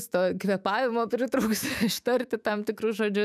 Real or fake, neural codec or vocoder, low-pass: fake; autoencoder, 48 kHz, 128 numbers a frame, DAC-VAE, trained on Japanese speech; 14.4 kHz